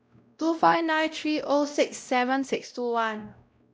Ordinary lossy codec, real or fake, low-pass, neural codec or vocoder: none; fake; none; codec, 16 kHz, 0.5 kbps, X-Codec, WavLM features, trained on Multilingual LibriSpeech